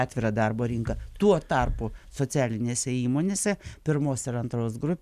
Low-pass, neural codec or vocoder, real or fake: 14.4 kHz; none; real